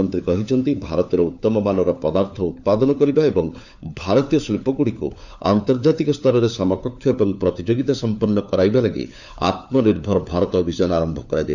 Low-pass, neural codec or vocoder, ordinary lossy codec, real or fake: 7.2 kHz; codec, 16 kHz, 4 kbps, FunCodec, trained on LibriTTS, 50 frames a second; none; fake